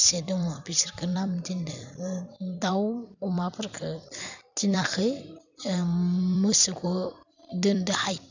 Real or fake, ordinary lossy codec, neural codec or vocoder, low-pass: fake; none; vocoder, 44.1 kHz, 128 mel bands every 256 samples, BigVGAN v2; 7.2 kHz